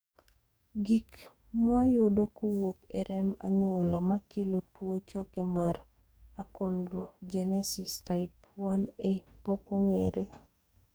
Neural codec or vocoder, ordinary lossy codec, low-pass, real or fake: codec, 44.1 kHz, 2.6 kbps, DAC; none; none; fake